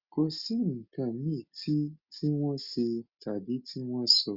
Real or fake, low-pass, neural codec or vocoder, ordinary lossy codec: real; 5.4 kHz; none; Opus, 64 kbps